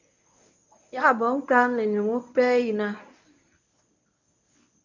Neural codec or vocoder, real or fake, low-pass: codec, 24 kHz, 0.9 kbps, WavTokenizer, medium speech release version 1; fake; 7.2 kHz